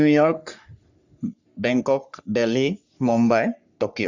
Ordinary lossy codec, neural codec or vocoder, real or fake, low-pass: Opus, 64 kbps; codec, 44.1 kHz, 3.4 kbps, Pupu-Codec; fake; 7.2 kHz